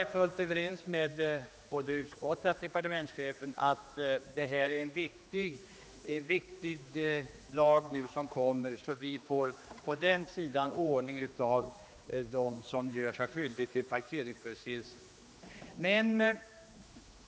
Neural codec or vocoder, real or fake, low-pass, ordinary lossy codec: codec, 16 kHz, 2 kbps, X-Codec, HuBERT features, trained on general audio; fake; none; none